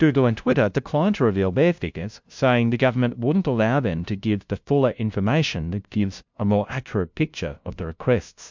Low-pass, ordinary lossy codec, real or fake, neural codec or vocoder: 7.2 kHz; MP3, 64 kbps; fake; codec, 16 kHz, 0.5 kbps, FunCodec, trained on LibriTTS, 25 frames a second